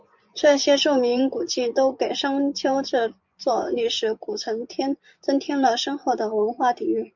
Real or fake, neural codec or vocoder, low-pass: fake; vocoder, 24 kHz, 100 mel bands, Vocos; 7.2 kHz